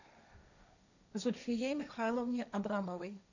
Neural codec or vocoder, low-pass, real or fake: codec, 16 kHz, 1.1 kbps, Voila-Tokenizer; 7.2 kHz; fake